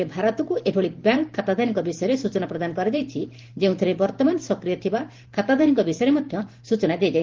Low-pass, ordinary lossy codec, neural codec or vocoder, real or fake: 7.2 kHz; Opus, 16 kbps; vocoder, 44.1 kHz, 128 mel bands every 512 samples, BigVGAN v2; fake